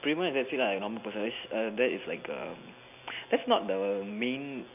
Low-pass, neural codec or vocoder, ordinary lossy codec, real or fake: 3.6 kHz; none; none; real